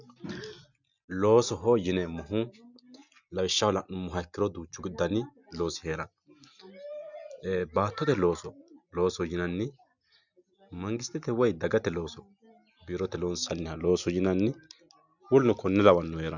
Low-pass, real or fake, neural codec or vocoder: 7.2 kHz; real; none